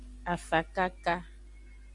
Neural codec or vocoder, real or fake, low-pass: none; real; 10.8 kHz